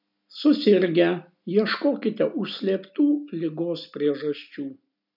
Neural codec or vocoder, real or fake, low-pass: none; real; 5.4 kHz